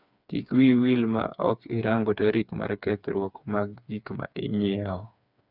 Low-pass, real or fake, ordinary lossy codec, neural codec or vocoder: 5.4 kHz; fake; none; codec, 16 kHz, 4 kbps, FreqCodec, smaller model